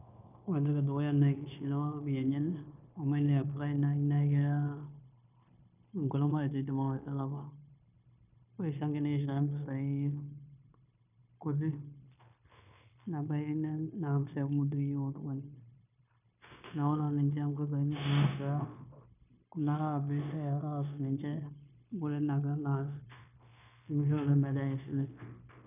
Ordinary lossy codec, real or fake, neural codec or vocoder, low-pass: none; fake; codec, 16 kHz, 0.9 kbps, LongCat-Audio-Codec; 3.6 kHz